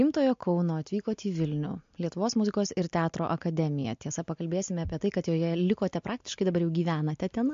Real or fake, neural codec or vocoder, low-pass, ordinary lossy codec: real; none; 7.2 kHz; MP3, 48 kbps